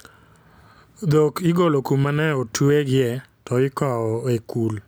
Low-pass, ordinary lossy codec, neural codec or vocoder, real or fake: none; none; none; real